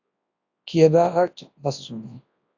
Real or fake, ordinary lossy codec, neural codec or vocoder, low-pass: fake; AAC, 48 kbps; codec, 24 kHz, 0.9 kbps, WavTokenizer, large speech release; 7.2 kHz